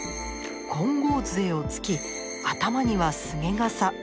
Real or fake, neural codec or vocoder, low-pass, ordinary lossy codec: real; none; none; none